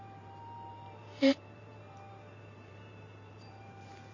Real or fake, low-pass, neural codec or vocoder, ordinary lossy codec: fake; 7.2 kHz; vocoder, 44.1 kHz, 128 mel bands every 512 samples, BigVGAN v2; none